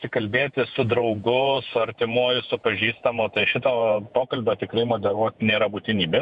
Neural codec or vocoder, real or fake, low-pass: vocoder, 48 kHz, 128 mel bands, Vocos; fake; 10.8 kHz